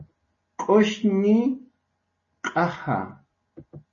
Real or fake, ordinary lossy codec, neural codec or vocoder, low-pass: real; MP3, 32 kbps; none; 7.2 kHz